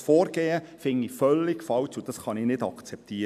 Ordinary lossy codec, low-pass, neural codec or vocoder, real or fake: none; 14.4 kHz; none; real